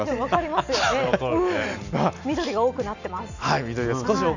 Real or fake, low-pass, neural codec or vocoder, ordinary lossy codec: real; 7.2 kHz; none; none